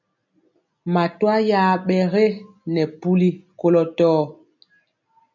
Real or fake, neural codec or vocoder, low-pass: real; none; 7.2 kHz